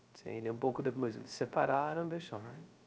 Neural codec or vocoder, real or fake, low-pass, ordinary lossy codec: codec, 16 kHz, 0.3 kbps, FocalCodec; fake; none; none